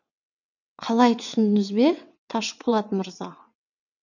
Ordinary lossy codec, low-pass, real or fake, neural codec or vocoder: none; 7.2 kHz; fake; vocoder, 22.05 kHz, 80 mel bands, WaveNeXt